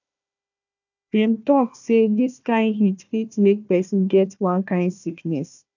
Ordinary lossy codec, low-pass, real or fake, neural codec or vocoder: none; 7.2 kHz; fake; codec, 16 kHz, 1 kbps, FunCodec, trained on Chinese and English, 50 frames a second